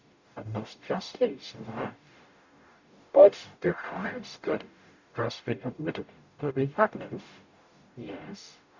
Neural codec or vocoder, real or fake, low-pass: codec, 44.1 kHz, 0.9 kbps, DAC; fake; 7.2 kHz